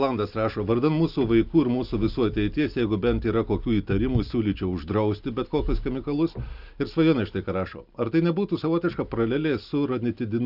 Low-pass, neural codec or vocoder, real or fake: 5.4 kHz; none; real